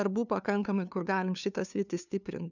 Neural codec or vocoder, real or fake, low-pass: codec, 16 kHz, 2 kbps, FunCodec, trained on LibriTTS, 25 frames a second; fake; 7.2 kHz